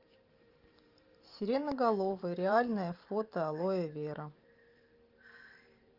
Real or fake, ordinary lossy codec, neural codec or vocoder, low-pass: fake; Opus, 24 kbps; vocoder, 44.1 kHz, 128 mel bands every 512 samples, BigVGAN v2; 5.4 kHz